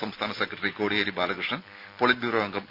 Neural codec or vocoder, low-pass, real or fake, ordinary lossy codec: none; 5.4 kHz; real; none